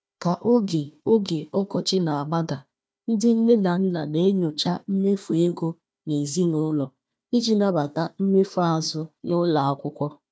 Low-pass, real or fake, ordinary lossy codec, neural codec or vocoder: none; fake; none; codec, 16 kHz, 1 kbps, FunCodec, trained on Chinese and English, 50 frames a second